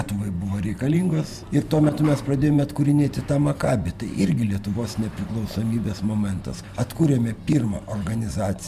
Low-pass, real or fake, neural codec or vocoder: 14.4 kHz; fake; vocoder, 44.1 kHz, 128 mel bands every 512 samples, BigVGAN v2